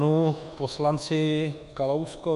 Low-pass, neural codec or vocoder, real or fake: 10.8 kHz; codec, 24 kHz, 1.2 kbps, DualCodec; fake